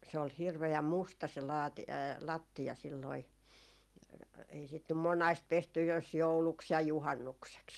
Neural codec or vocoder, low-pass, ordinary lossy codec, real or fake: none; 19.8 kHz; Opus, 32 kbps; real